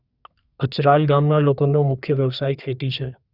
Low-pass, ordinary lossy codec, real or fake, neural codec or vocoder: 5.4 kHz; Opus, 64 kbps; fake; codec, 32 kHz, 1.9 kbps, SNAC